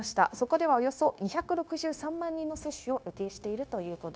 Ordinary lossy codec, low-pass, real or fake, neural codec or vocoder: none; none; fake; codec, 16 kHz, 0.9 kbps, LongCat-Audio-Codec